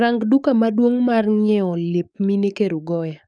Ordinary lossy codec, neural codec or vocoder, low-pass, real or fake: none; codec, 44.1 kHz, 7.8 kbps, DAC; 9.9 kHz; fake